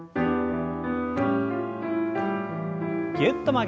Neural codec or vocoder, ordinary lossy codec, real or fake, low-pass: none; none; real; none